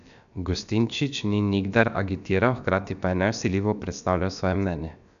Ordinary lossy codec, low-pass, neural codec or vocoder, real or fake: none; 7.2 kHz; codec, 16 kHz, about 1 kbps, DyCAST, with the encoder's durations; fake